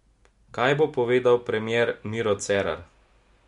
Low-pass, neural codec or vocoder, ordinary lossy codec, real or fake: 10.8 kHz; none; MP3, 64 kbps; real